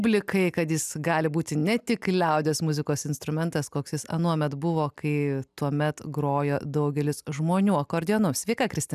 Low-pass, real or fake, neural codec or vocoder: 14.4 kHz; real; none